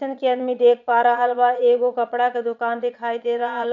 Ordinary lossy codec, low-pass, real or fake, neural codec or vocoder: none; 7.2 kHz; fake; vocoder, 22.05 kHz, 80 mel bands, Vocos